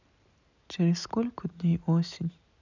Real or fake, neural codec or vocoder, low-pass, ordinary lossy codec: real; none; 7.2 kHz; none